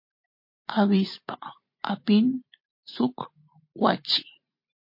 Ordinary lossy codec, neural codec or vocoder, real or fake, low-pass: MP3, 32 kbps; vocoder, 44.1 kHz, 128 mel bands every 512 samples, BigVGAN v2; fake; 5.4 kHz